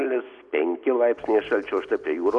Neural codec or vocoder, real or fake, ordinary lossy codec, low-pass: none; real; Opus, 64 kbps; 10.8 kHz